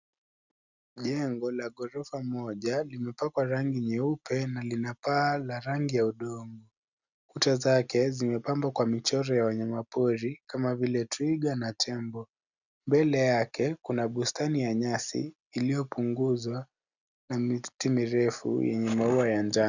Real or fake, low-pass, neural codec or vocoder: real; 7.2 kHz; none